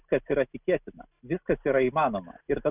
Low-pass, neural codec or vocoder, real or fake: 3.6 kHz; none; real